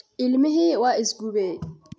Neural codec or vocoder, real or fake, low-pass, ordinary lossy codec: none; real; none; none